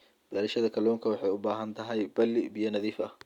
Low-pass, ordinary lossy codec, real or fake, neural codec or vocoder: 19.8 kHz; none; real; none